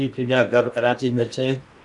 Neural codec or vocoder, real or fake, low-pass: codec, 16 kHz in and 24 kHz out, 0.8 kbps, FocalCodec, streaming, 65536 codes; fake; 10.8 kHz